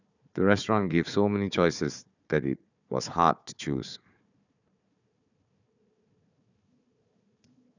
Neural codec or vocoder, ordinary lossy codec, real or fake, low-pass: codec, 16 kHz, 4 kbps, FunCodec, trained on Chinese and English, 50 frames a second; none; fake; 7.2 kHz